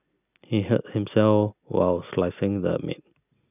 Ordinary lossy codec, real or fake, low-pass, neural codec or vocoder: none; real; 3.6 kHz; none